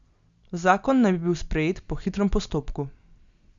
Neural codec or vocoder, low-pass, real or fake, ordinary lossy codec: none; 7.2 kHz; real; Opus, 64 kbps